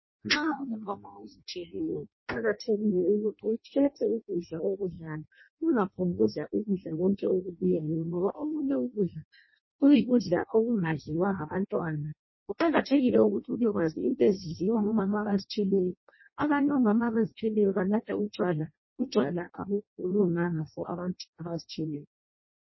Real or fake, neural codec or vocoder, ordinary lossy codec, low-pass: fake; codec, 16 kHz in and 24 kHz out, 0.6 kbps, FireRedTTS-2 codec; MP3, 24 kbps; 7.2 kHz